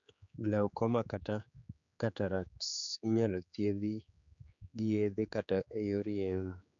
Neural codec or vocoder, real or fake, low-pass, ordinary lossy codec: codec, 16 kHz, 4 kbps, X-Codec, HuBERT features, trained on general audio; fake; 7.2 kHz; none